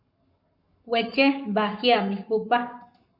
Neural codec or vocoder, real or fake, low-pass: codec, 44.1 kHz, 7.8 kbps, Pupu-Codec; fake; 5.4 kHz